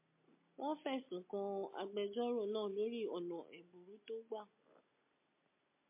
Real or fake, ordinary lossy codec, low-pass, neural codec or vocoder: real; MP3, 24 kbps; 3.6 kHz; none